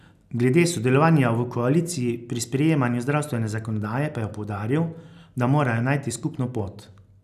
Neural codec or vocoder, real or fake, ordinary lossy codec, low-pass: none; real; none; 14.4 kHz